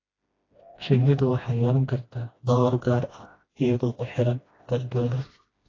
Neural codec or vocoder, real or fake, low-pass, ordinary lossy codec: codec, 16 kHz, 1 kbps, FreqCodec, smaller model; fake; 7.2 kHz; AAC, 32 kbps